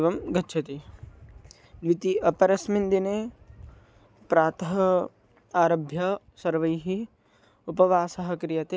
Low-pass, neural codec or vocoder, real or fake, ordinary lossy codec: none; none; real; none